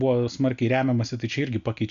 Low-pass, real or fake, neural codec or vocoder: 7.2 kHz; real; none